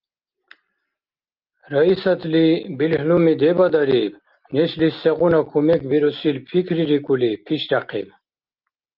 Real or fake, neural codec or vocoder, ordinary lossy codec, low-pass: real; none; Opus, 24 kbps; 5.4 kHz